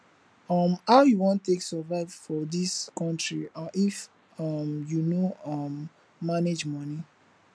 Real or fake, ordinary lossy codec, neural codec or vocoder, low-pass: real; none; none; none